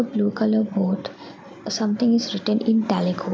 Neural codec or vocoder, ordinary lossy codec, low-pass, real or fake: none; none; none; real